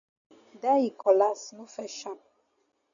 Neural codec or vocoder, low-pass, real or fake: none; 7.2 kHz; real